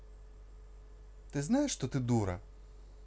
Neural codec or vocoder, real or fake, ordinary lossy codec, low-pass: none; real; none; none